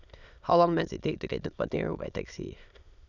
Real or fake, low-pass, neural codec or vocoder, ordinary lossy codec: fake; 7.2 kHz; autoencoder, 22.05 kHz, a latent of 192 numbers a frame, VITS, trained on many speakers; none